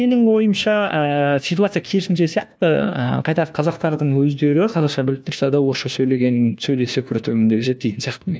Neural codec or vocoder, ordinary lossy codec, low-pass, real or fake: codec, 16 kHz, 1 kbps, FunCodec, trained on LibriTTS, 50 frames a second; none; none; fake